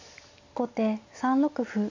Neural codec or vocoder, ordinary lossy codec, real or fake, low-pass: none; AAC, 48 kbps; real; 7.2 kHz